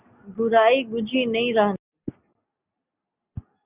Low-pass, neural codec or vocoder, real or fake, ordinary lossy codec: 3.6 kHz; none; real; Opus, 64 kbps